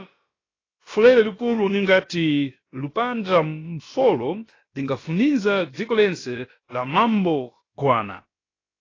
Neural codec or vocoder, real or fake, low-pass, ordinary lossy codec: codec, 16 kHz, about 1 kbps, DyCAST, with the encoder's durations; fake; 7.2 kHz; AAC, 32 kbps